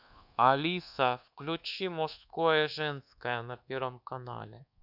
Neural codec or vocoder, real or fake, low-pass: codec, 24 kHz, 1.2 kbps, DualCodec; fake; 5.4 kHz